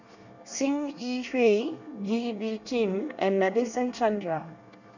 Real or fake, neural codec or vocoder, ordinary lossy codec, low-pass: fake; codec, 24 kHz, 1 kbps, SNAC; none; 7.2 kHz